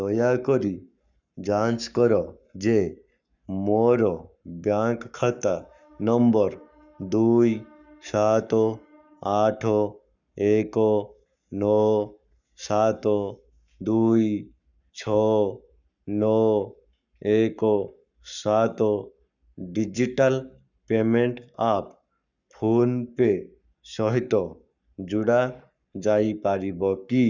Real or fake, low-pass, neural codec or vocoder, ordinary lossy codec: fake; 7.2 kHz; codec, 44.1 kHz, 7.8 kbps, Pupu-Codec; none